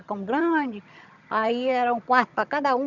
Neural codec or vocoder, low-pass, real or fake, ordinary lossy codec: vocoder, 22.05 kHz, 80 mel bands, HiFi-GAN; 7.2 kHz; fake; none